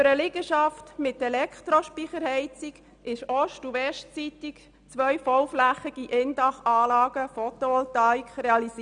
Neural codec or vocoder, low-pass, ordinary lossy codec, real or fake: none; 9.9 kHz; none; real